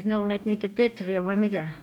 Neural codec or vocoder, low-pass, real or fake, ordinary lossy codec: codec, 44.1 kHz, 2.6 kbps, DAC; 19.8 kHz; fake; none